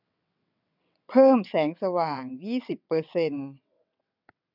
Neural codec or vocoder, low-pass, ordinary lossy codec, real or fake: vocoder, 22.05 kHz, 80 mel bands, WaveNeXt; 5.4 kHz; none; fake